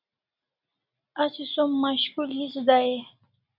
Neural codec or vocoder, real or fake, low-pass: none; real; 5.4 kHz